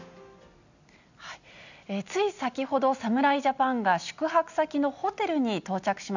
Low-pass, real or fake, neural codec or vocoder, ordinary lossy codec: 7.2 kHz; real; none; none